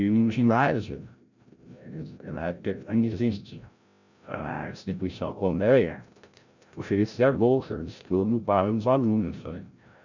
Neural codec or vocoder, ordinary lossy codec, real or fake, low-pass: codec, 16 kHz, 0.5 kbps, FreqCodec, larger model; none; fake; 7.2 kHz